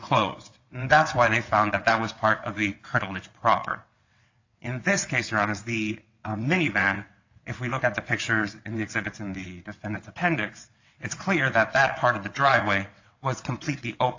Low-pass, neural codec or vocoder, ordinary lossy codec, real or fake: 7.2 kHz; vocoder, 22.05 kHz, 80 mel bands, WaveNeXt; AAC, 48 kbps; fake